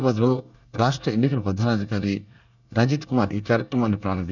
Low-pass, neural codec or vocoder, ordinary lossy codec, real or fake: 7.2 kHz; codec, 24 kHz, 1 kbps, SNAC; none; fake